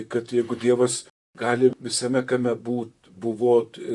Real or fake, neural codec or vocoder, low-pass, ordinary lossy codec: fake; vocoder, 44.1 kHz, 128 mel bands, Pupu-Vocoder; 10.8 kHz; MP3, 96 kbps